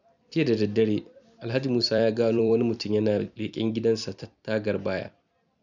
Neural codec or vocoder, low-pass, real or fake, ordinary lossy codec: vocoder, 44.1 kHz, 128 mel bands every 256 samples, BigVGAN v2; 7.2 kHz; fake; none